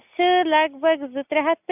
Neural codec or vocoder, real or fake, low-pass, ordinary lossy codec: none; real; 3.6 kHz; none